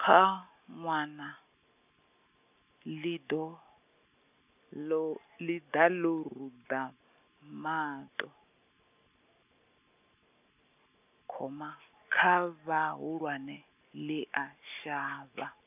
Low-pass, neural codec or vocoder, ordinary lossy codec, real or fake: 3.6 kHz; none; none; real